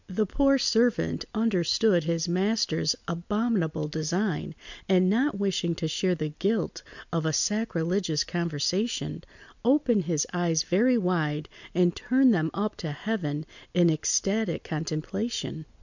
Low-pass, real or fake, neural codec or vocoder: 7.2 kHz; real; none